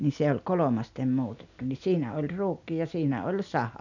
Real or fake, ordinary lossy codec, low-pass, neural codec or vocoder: real; none; 7.2 kHz; none